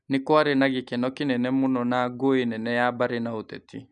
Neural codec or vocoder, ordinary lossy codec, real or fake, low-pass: none; none; real; 9.9 kHz